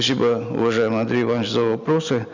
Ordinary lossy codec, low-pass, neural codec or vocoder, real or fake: none; 7.2 kHz; none; real